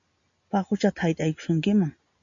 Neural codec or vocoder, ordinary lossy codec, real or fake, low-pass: none; MP3, 64 kbps; real; 7.2 kHz